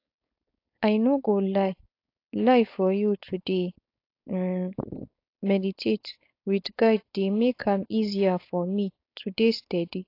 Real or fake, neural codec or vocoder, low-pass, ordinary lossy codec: fake; codec, 16 kHz, 4.8 kbps, FACodec; 5.4 kHz; AAC, 32 kbps